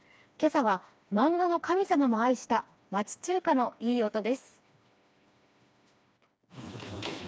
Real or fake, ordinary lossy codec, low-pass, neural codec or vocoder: fake; none; none; codec, 16 kHz, 2 kbps, FreqCodec, smaller model